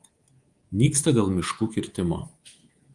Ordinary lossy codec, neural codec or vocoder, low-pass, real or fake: Opus, 24 kbps; codec, 24 kHz, 3.1 kbps, DualCodec; 10.8 kHz; fake